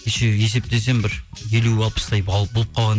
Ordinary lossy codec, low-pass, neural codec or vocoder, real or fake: none; none; none; real